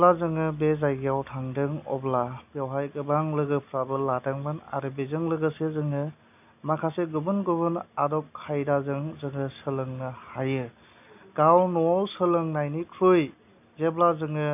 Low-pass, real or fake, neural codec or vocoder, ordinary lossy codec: 3.6 kHz; real; none; none